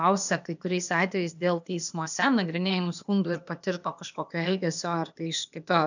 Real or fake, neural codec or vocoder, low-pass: fake; codec, 16 kHz, 0.8 kbps, ZipCodec; 7.2 kHz